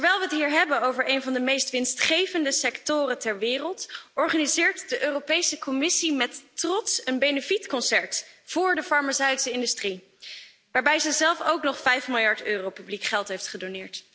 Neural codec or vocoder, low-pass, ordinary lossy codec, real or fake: none; none; none; real